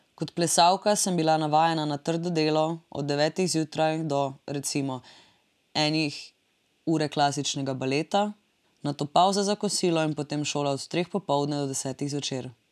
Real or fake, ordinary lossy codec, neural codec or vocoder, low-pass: real; none; none; 14.4 kHz